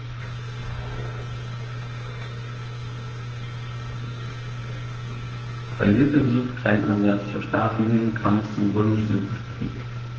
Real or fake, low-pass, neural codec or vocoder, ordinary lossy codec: fake; 7.2 kHz; codec, 44.1 kHz, 2.6 kbps, SNAC; Opus, 16 kbps